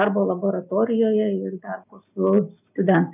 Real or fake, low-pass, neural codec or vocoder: real; 3.6 kHz; none